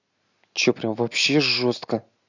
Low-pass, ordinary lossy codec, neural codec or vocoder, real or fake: 7.2 kHz; none; none; real